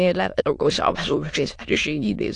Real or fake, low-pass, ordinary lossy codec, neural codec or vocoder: fake; 9.9 kHz; AAC, 48 kbps; autoencoder, 22.05 kHz, a latent of 192 numbers a frame, VITS, trained on many speakers